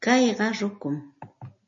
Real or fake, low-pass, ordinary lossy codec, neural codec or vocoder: real; 7.2 kHz; MP3, 32 kbps; none